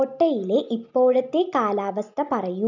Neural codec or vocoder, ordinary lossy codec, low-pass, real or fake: none; none; 7.2 kHz; real